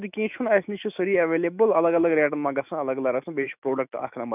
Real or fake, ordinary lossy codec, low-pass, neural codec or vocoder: fake; none; 3.6 kHz; autoencoder, 48 kHz, 128 numbers a frame, DAC-VAE, trained on Japanese speech